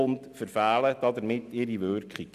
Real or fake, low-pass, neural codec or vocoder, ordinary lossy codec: real; 14.4 kHz; none; none